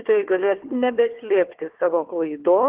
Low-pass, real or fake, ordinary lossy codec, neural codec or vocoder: 3.6 kHz; fake; Opus, 16 kbps; codec, 16 kHz, 4 kbps, FunCodec, trained on Chinese and English, 50 frames a second